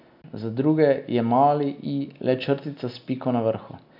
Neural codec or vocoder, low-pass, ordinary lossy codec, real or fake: none; 5.4 kHz; none; real